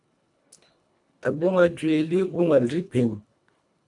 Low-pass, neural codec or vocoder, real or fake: 10.8 kHz; codec, 24 kHz, 1.5 kbps, HILCodec; fake